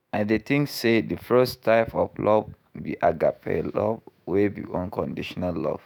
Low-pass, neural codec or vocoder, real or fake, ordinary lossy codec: none; autoencoder, 48 kHz, 128 numbers a frame, DAC-VAE, trained on Japanese speech; fake; none